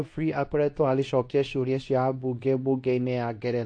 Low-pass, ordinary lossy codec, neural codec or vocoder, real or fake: 9.9 kHz; AAC, 48 kbps; codec, 24 kHz, 0.9 kbps, WavTokenizer, medium speech release version 2; fake